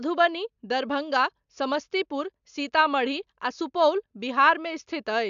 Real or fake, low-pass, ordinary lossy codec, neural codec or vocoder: real; 7.2 kHz; AAC, 96 kbps; none